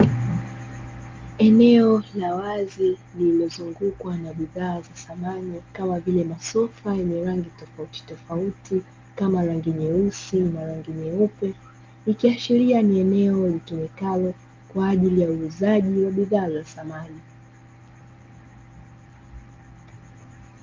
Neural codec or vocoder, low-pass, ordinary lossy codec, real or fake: none; 7.2 kHz; Opus, 32 kbps; real